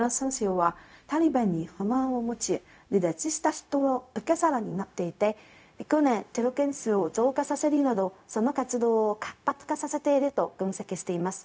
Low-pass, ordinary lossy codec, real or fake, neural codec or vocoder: none; none; fake; codec, 16 kHz, 0.4 kbps, LongCat-Audio-Codec